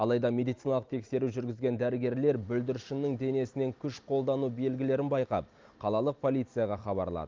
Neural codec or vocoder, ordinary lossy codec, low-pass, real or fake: none; Opus, 24 kbps; 7.2 kHz; real